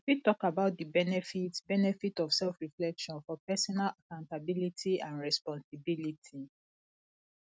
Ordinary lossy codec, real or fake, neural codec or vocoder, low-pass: none; real; none; none